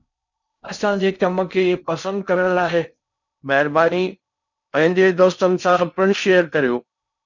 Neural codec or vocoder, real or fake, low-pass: codec, 16 kHz in and 24 kHz out, 0.6 kbps, FocalCodec, streaming, 4096 codes; fake; 7.2 kHz